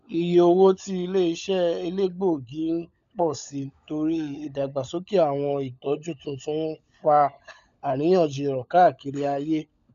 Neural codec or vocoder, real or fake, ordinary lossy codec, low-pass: codec, 16 kHz, 16 kbps, FunCodec, trained on LibriTTS, 50 frames a second; fake; none; 7.2 kHz